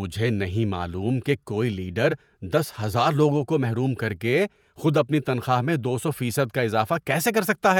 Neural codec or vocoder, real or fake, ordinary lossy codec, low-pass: vocoder, 48 kHz, 128 mel bands, Vocos; fake; none; 19.8 kHz